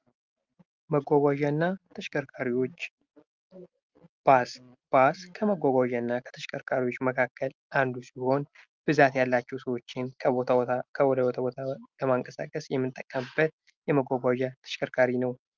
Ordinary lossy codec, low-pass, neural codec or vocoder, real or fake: Opus, 32 kbps; 7.2 kHz; none; real